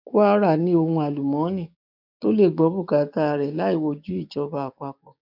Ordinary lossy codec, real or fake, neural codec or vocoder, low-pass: none; fake; codec, 16 kHz, 6 kbps, DAC; 5.4 kHz